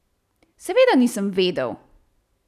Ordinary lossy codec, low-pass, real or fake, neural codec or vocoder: none; 14.4 kHz; real; none